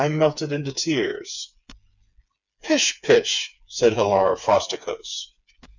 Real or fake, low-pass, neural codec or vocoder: fake; 7.2 kHz; codec, 16 kHz, 4 kbps, FreqCodec, smaller model